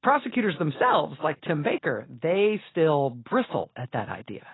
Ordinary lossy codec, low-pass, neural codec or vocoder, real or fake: AAC, 16 kbps; 7.2 kHz; none; real